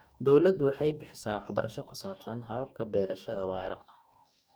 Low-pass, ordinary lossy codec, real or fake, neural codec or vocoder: none; none; fake; codec, 44.1 kHz, 2.6 kbps, DAC